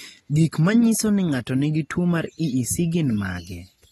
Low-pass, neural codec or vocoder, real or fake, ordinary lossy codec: 19.8 kHz; none; real; AAC, 32 kbps